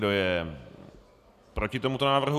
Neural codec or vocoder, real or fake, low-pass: none; real; 14.4 kHz